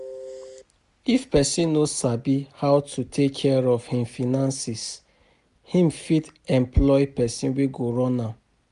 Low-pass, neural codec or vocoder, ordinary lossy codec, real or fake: 10.8 kHz; none; none; real